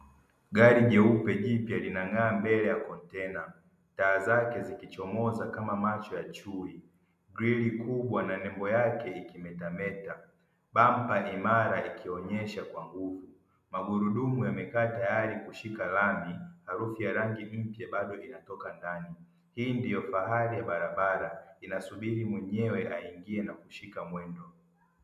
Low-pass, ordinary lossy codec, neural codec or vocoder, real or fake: 14.4 kHz; MP3, 96 kbps; none; real